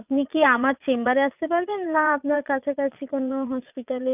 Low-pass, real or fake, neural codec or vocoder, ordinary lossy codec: 3.6 kHz; fake; vocoder, 22.05 kHz, 80 mel bands, Vocos; none